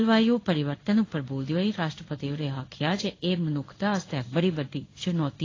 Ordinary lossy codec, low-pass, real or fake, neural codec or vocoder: AAC, 32 kbps; 7.2 kHz; fake; codec, 16 kHz in and 24 kHz out, 1 kbps, XY-Tokenizer